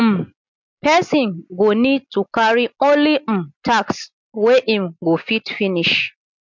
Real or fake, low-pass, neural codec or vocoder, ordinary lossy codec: real; 7.2 kHz; none; MP3, 64 kbps